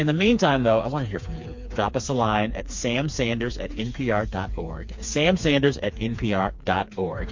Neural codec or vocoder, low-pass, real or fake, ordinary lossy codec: codec, 16 kHz, 4 kbps, FreqCodec, smaller model; 7.2 kHz; fake; MP3, 48 kbps